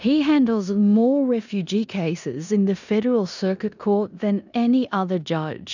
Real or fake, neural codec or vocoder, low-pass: fake; codec, 16 kHz in and 24 kHz out, 0.9 kbps, LongCat-Audio-Codec, four codebook decoder; 7.2 kHz